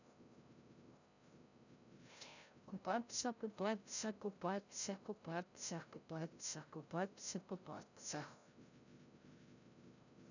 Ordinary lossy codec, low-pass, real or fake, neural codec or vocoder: none; 7.2 kHz; fake; codec, 16 kHz, 0.5 kbps, FreqCodec, larger model